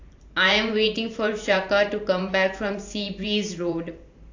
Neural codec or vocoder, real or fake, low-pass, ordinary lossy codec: vocoder, 44.1 kHz, 128 mel bands every 512 samples, BigVGAN v2; fake; 7.2 kHz; AAC, 48 kbps